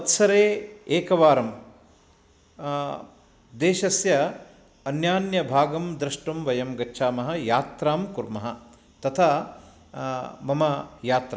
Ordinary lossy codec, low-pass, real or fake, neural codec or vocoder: none; none; real; none